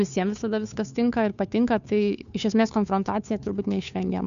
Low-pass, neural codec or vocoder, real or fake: 7.2 kHz; codec, 16 kHz, 2 kbps, FunCodec, trained on Chinese and English, 25 frames a second; fake